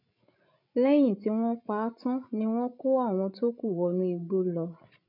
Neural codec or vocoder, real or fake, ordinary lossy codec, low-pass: codec, 16 kHz, 16 kbps, FreqCodec, larger model; fake; none; 5.4 kHz